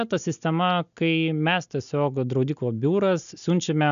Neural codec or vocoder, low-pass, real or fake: none; 7.2 kHz; real